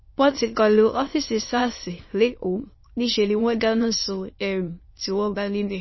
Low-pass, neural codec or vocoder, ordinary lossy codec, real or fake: 7.2 kHz; autoencoder, 22.05 kHz, a latent of 192 numbers a frame, VITS, trained on many speakers; MP3, 24 kbps; fake